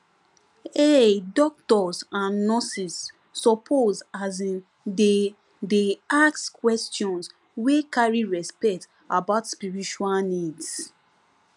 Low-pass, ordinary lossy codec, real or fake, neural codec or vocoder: 10.8 kHz; none; real; none